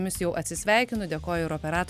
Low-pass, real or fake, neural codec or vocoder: 14.4 kHz; real; none